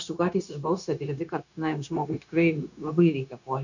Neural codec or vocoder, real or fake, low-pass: codec, 16 kHz, 0.9 kbps, LongCat-Audio-Codec; fake; 7.2 kHz